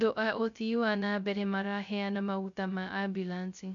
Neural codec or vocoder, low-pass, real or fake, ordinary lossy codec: codec, 16 kHz, 0.2 kbps, FocalCodec; 7.2 kHz; fake; MP3, 96 kbps